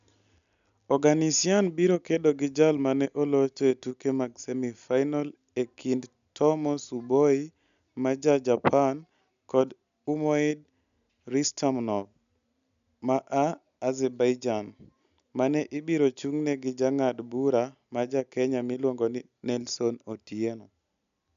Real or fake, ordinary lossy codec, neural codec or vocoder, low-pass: real; none; none; 7.2 kHz